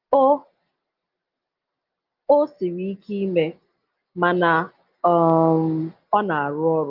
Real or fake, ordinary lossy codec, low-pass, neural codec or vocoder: real; Opus, 32 kbps; 5.4 kHz; none